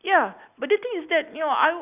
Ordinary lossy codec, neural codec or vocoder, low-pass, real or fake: none; none; 3.6 kHz; real